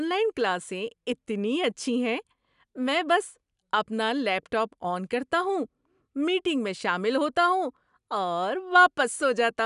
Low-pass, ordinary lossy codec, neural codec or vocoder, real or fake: 10.8 kHz; none; none; real